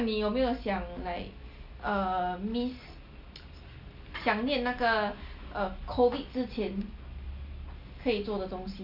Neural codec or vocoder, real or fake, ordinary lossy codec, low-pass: none; real; none; 5.4 kHz